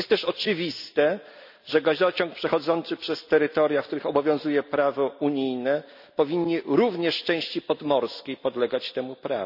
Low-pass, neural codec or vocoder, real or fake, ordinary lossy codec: 5.4 kHz; none; real; none